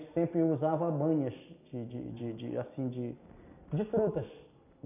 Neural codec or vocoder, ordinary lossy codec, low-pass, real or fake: none; none; 3.6 kHz; real